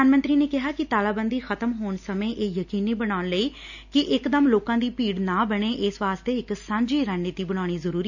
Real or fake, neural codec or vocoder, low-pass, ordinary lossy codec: real; none; 7.2 kHz; none